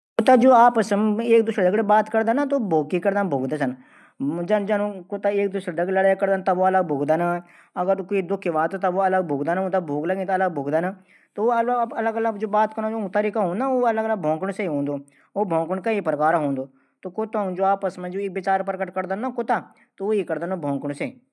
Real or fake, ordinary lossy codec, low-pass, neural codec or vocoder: real; none; none; none